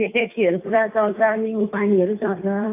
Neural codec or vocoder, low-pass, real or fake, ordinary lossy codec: codec, 16 kHz, 2 kbps, FunCodec, trained on Chinese and English, 25 frames a second; 3.6 kHz; fake; AAC, 24 kbps